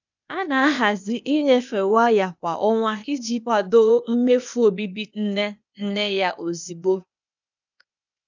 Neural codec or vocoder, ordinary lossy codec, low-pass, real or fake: codec, 16 kHz, 0.8 kbps, ZipCodec; none; 7.2 kHz; fake